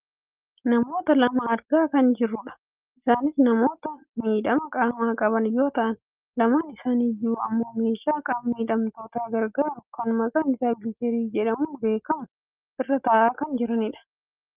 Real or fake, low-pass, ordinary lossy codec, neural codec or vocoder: real; 3.6 kHz; Opus, 32 kbps; none